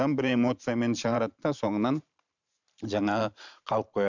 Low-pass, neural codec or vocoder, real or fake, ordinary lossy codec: 7.2 kHz; vocoder, 44.1 kHz, 128 mel bands every 512 samples, BigVGAN v2; fake; none